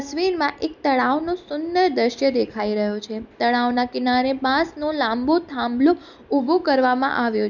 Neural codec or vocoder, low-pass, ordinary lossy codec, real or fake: none; 7.2 kHz; none; real